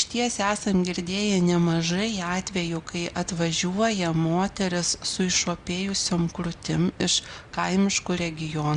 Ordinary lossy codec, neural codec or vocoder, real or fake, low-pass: Opus, 64 kbps; none; real; 9.9 kHz